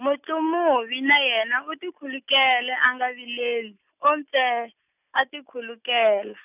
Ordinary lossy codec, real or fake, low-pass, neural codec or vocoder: none; real; 3.6 kHz; none